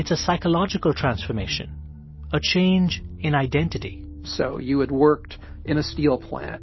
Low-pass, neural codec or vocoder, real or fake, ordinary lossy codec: 7.2 kHz; none; real; MP3, 24 kbps